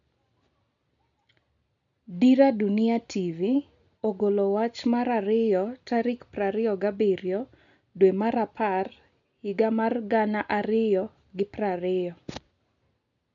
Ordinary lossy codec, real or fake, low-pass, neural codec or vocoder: none; real; 7.2 kHz; none